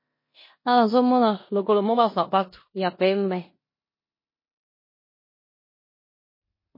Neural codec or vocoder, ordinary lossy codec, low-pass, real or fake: codec, 16 kHz in and 24 kHz out, 0.9 kbps, LongCat-Audio-Codec, four codebook decoder; MP3, 24 kbps; 5.4 kHz; fake